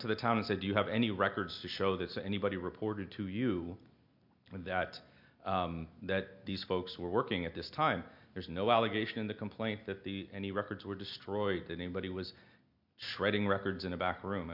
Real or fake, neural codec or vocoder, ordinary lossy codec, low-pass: real; none; MP3, 48 kbps; 5.4 kHz